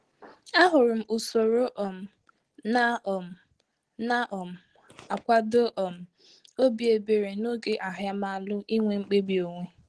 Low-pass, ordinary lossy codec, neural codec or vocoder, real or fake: 10.8 kHz; Opus, 16 kbps; none; real